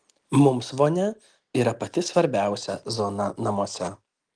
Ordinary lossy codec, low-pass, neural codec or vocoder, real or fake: Opus, 24 kbps; 9.9 kHz; none; real